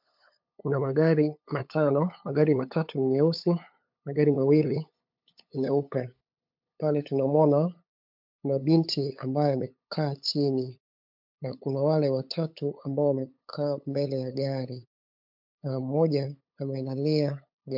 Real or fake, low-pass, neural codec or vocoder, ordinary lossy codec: fake; 5.4 kHz; codec, 16 kHz, 8 kbps, FunCodec, trained on LibriTTS, 25 frames a second; MP3, 48 kbps